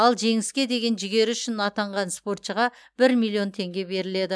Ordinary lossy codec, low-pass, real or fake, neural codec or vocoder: none; none; real; none